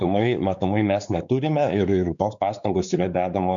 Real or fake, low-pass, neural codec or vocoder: fake; 7.2 kHz; codec, 16 kHz, 4 kbps, X-Codec, WavLM features, trained on Multilingual LibriSpeech